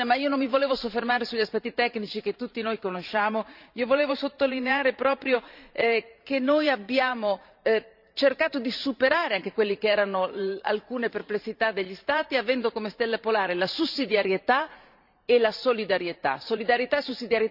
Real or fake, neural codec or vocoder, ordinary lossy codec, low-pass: fake; vocoder, 44.1 kHz, 128 mel bands every 512 samples, BigVGAN v2; none; 5.4 kHz